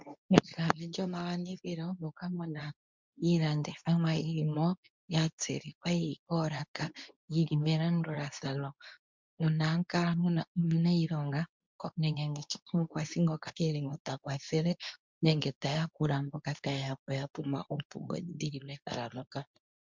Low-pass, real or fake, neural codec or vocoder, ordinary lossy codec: 7.2 kHz; fake; codec, 24 kHz, 0.9 kbps, WavTokenizer, medium speech release version 2; MP3, 64 kbps